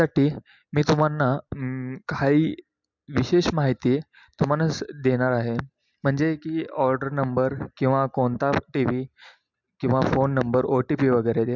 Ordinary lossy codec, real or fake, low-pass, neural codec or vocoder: MP3, 64 kbps; real; 7.2 kHz; none